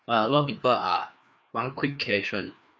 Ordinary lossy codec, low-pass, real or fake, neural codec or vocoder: none; none; fake; codec, 16 kHz, 2 kbps, FreqCodec, larger model